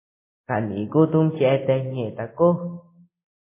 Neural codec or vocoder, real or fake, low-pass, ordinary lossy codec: none; real; 3.6 kHz; MP3, 16 kbps